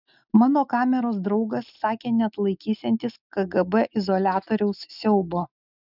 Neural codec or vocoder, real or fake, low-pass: none; real; 5.4 kHz